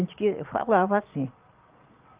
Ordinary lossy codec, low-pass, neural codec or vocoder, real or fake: Opus, 32 kbps; 3.6 kHz; vocoder, 22.05 kHz, 80 mel bands, Vocos; fake